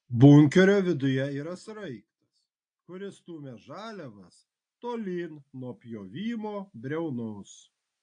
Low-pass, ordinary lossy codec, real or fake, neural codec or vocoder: 9.9 kHz; AAC, 48 kbps; real; none